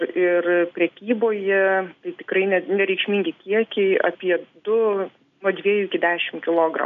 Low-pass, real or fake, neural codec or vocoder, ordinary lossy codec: 7.2 kHz; real; none; AAC, 96 kbps